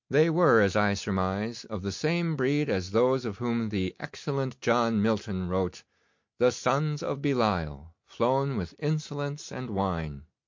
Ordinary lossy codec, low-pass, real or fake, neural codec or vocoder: MP3, 48 kbps; 7.2 kHz; real; none